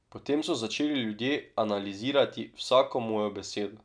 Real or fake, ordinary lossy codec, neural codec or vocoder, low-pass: real; MP3, 96 kbps; none; 9.9 kHz